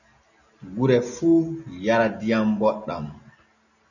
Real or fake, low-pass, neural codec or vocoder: real; 7.2 kHz; none